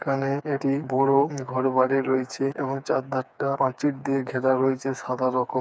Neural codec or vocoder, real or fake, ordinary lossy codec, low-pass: codec, 16 kHz, 4 kbps, FreqCodec, smaller model; fake; none; none